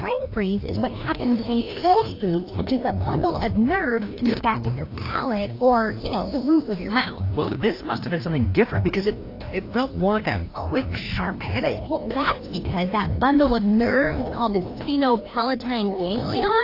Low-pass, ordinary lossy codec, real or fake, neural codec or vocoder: 5.4 kHz; AAC, 32 kbps; fake; codec, 16 kHz, 1 kbps, FreqCodec, larger model